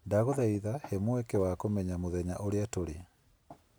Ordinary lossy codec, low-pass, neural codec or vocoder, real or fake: none; none; none; real